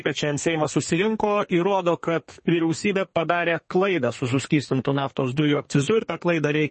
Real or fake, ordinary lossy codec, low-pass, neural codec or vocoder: fake; MP3, 32 kbps; 10.8 kHz; codec, 32 kHz, 1.9 kbps, SNAC